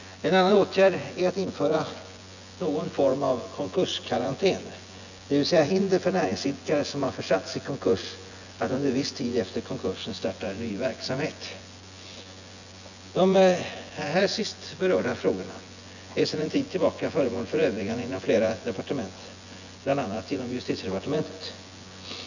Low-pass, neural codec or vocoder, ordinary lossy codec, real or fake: 7.2 kHz; vocoder, 24 kHz, 100 mel bands, Vocos; none; fake